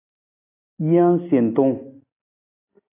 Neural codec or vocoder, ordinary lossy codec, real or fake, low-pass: none; AAC, 24 kbps; real; 3.6 kHz